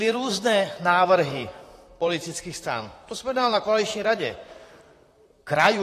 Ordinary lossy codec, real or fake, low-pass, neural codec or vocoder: AAC, 48 kbps; fake; 14.4 kHz; vocoder, 44.1 kHz, 128 mel bands, Pupu-Vocoder